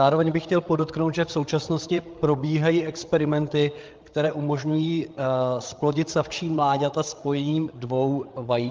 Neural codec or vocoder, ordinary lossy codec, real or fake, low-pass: codec, 16 kHz, 8 kbps, FreqCodec, larger model; Opus, 32 kbps; fake; 7.2 kHz